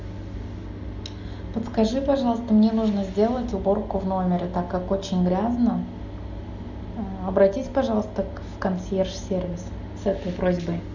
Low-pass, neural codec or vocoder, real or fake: 7.2 kHz; none; real